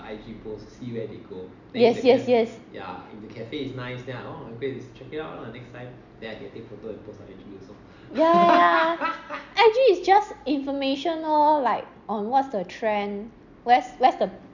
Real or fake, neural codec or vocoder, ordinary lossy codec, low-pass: real; none; none; 7.2 kHz